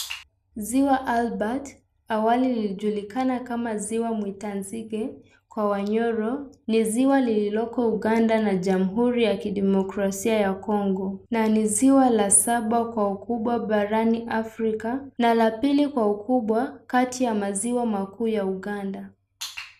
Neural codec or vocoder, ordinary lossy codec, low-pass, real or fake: none; none; 14.4 kHz; real